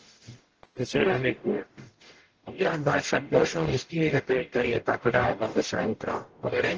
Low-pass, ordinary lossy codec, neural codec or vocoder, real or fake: 7.2 kHz; Opus, 16 kbps; codec, 44.1 kHz, 0.9 kbps, DAC; fake